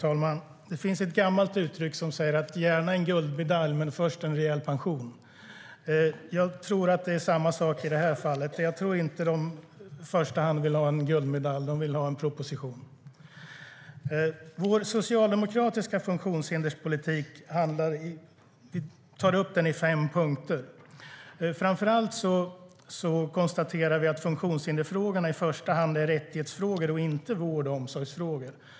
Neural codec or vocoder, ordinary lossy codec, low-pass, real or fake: none; none; none; real